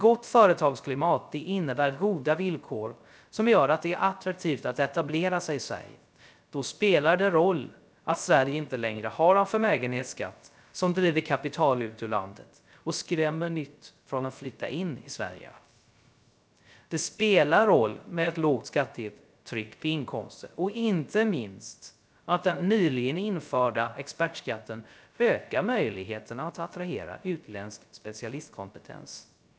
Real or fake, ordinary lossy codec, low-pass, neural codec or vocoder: fake; none; none; codec, 16 kHz, 0.3 kbps, FocalCodec